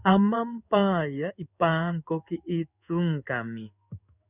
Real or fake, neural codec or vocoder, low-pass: fake; codec, 16 kHz in and 24 kHz out, 1 kbps, XY-Tokenizer; 3.6 kHz